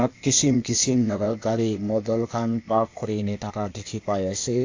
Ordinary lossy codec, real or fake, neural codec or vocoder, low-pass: AAC, 48 kbps; fake; codec, 16 kHz, 0.8 kbps, ZipCodec; 7.2 kHz